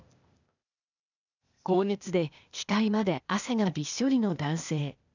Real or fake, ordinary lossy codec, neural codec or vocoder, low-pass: fake; none; codec, 16 kHz, 0.8 kbps, ZipCodec; 7.2 kHz